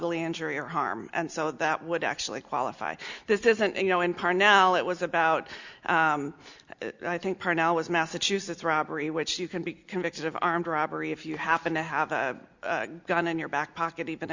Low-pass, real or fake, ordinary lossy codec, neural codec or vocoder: 7.2 kHz; real; Opus, 64 kbps; none